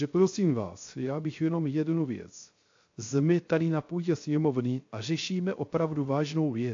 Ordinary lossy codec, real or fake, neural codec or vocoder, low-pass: AAC, 48 kbps; fake; codec, 16 kHz, 0.3 kbps, FocalCodec; 7.2 kHz